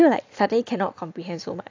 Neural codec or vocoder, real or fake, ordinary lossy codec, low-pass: none; real; AAC, 48 kbps; 7.2 kHz